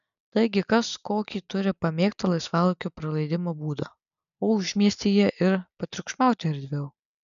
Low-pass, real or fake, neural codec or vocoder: 7.2 kHz; real; none